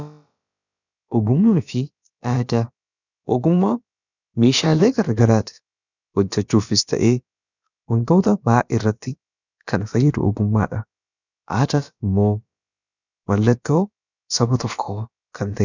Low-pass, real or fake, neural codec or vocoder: 7.2 kHz; fake; codec, 16 kHz, about 1 kbps, DyCAST, with the encoder's durations